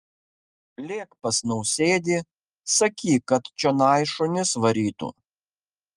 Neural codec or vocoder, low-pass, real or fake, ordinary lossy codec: none; 10.8 kHz; real; Opus, 32 kbps